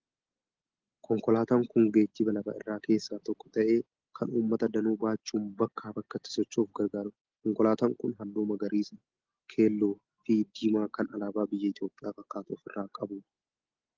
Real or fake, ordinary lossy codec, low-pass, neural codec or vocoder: real; Opus, 16 kbps; 7.2 kHz; none